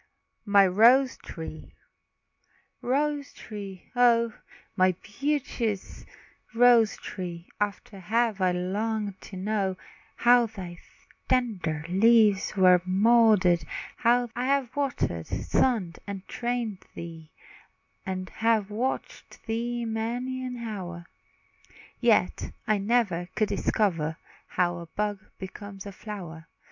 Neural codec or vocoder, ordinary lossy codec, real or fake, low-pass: none; MP3, 64 kbps; real; 7.2 kHz